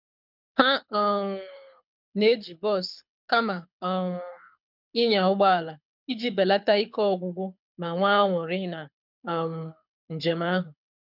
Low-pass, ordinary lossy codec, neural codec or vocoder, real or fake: 5.4 kHz; none; codec, 24 kHz, 6 kbps, HILCodec; fake